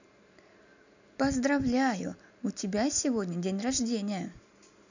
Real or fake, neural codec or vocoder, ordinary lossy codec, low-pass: real; none; none; 7.2 kHz